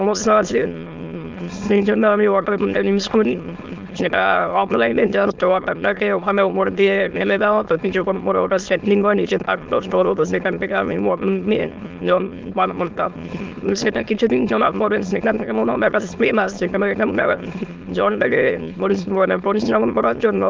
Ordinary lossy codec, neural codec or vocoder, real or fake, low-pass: Opus, 32 kbps; autoencoder, 22.05 kHz, a latent of 192 numbers a frame, VITS, trained on many speakers; fake; 7.2 kHz